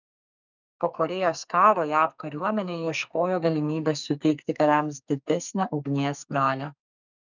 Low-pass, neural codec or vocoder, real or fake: 7.2 kHz; codec, 44.1 kHz, 2.6 kbps, SNAC; fake